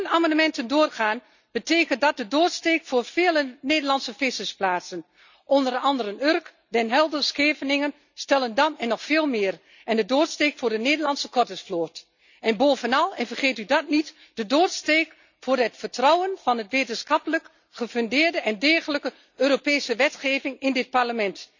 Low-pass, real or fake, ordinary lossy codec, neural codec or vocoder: 7.2 kHz; real; none; none